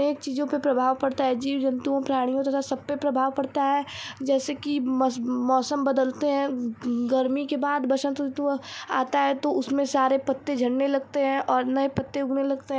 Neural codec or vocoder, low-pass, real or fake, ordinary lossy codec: none; none; real; none